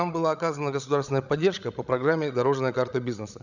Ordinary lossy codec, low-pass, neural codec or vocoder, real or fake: none; 7.2 kHz; codec, 16 kHz, 16 kbps, FreqCodec, larger model; fake